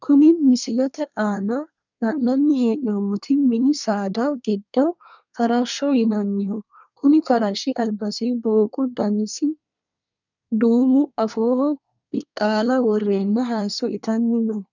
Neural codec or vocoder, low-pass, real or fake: codec, 24 kHz, 1 kbps, SNAC; 7.2 kHz; fake